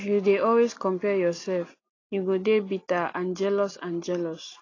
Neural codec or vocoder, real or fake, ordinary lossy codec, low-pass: none; real; AAC, 32 kbps; 7.2 kHz